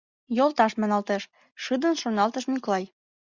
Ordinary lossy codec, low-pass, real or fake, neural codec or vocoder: Opus, 64 kbps; 7.2 kHz; real; none